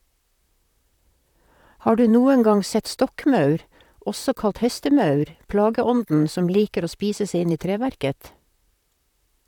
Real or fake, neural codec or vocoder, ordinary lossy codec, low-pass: fake; vocoder, 44.1 kHz, 128 mel bands, Pupu-Vocoder; none; 19.8 kHz